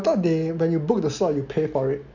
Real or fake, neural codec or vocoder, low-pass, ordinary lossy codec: real; none; 7.2 kHz; none